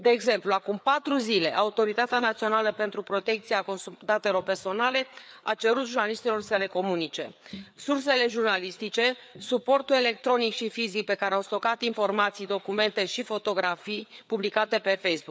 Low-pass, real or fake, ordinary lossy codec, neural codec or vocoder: none; fake; none; codec, 16 kHz, 4 kbps, FreqCodec, larger model